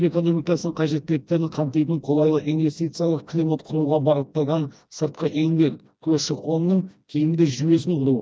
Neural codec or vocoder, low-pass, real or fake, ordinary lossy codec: codec, 16 kHz, 1 kbps, FreqCodec, smaller model; none; fake; none